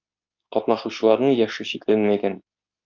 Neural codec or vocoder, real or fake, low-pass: codec, 24 kHz, 0.9 kbps, WavTokenizer, medium speech release version 2; fake; 7.2 kHz